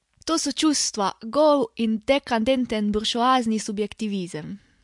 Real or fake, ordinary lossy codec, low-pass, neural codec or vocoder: real; MP3, 64 kbps; 10.8 kHz; none